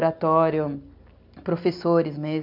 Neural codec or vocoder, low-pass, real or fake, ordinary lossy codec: none; 5.4 kHz; real; MP3, 48 kbps